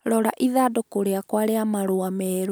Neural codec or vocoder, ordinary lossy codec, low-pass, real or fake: none; none; none; real